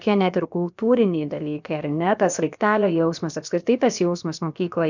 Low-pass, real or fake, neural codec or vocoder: 7.2 kHz; fake; codec, 16 kHz, about 1 kbps, DyCAST, with the encoder's durations